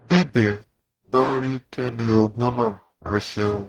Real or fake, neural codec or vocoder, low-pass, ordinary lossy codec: fake; codec, 44.1 kHz, 0.9 kbps, DAC; 19.8 kHz; Opus, 24 kbps